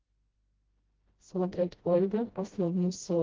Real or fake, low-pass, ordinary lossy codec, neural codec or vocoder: fake; 7.2 kHz; Opus, 16 kbps; codec, 16 kHz, 0.5 kbps, FreqCodec, smaller model